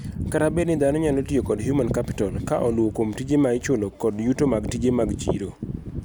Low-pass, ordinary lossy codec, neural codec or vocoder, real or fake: none; none; none; real